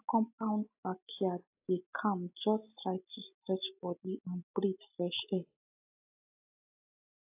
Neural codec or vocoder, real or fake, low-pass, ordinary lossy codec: none; real; 3.6 kHz; AAC, 32 kbps